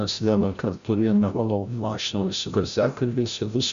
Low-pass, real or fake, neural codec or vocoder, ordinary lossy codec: 7.2 kHz; fake; codec, 16 kHz, 0.5 kbps, FreqCodec, larger model; Opus, 64 kbps